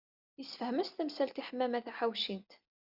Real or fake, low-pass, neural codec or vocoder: real; 5.4 kHz; none